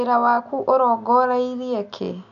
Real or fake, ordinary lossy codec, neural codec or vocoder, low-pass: real; none; none; 7.2 kHz